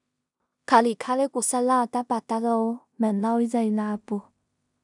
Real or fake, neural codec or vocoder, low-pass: fake; codec, 16 kHz in and 24 kHz out, 0.4 kbps, LongCat-Audio-Codec, two codebook decoder; 10.8 kHz